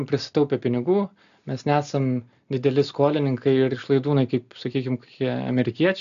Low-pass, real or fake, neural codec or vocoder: 7.2 kHz; real; none